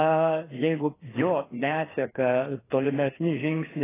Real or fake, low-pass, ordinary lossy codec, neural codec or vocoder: fake; 3.6 kHz; AAC, 16 kbps; codec, 16 kHz, 2 kbps, FreqCodec, larger model